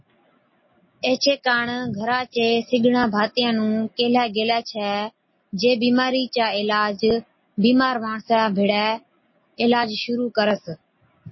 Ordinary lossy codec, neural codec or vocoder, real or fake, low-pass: MP3, 24 kbps; none; real; 7.2 kHz